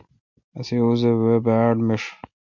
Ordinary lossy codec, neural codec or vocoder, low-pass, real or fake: MP3, 48 kbps; none; 7.2 kHz; real